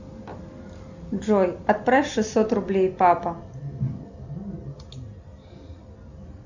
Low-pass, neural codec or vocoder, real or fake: 7.2 kHz; none; real